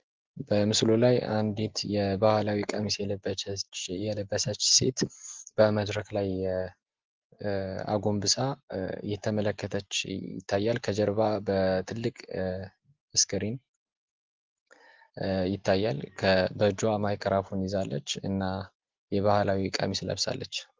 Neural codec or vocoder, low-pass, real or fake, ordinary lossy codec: none; 7.2 kHz; real; Opus, 16 kbps